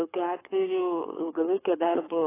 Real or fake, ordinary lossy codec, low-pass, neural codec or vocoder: fake; AAC, 16 kbps; 3.6 kHz; codec, 24 kHz, 6 kbps, HILCodec